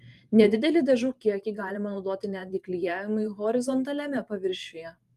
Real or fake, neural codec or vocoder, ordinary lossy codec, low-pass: fake; vocoder, 44.1 kHz, 128 mel bands every 512 samples, BigVGAN v2; Opus, 32 kbps; 14.4 kHz